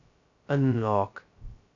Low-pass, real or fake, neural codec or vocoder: 7.2 kHz; fake; codec, 16 kHz, 0.2 kbps, FocalCodec